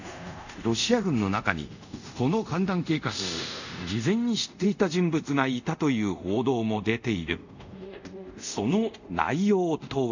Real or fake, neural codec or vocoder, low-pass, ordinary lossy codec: fake; codec, 24 kHz, 0.5 kbps, DualCodec; 7.2 kHz; none